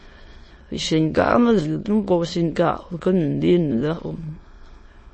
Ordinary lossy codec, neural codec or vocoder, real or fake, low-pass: MP3, 32 kbps; autoencoder, 22.05 kHz, a latent of 192 numbers a frame, VITS, trained on many speakers; fake; 9.9 kHz